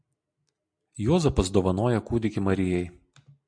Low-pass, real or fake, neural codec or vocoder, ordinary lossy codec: 9.9 kHz; real; none; MP3, 64 kbps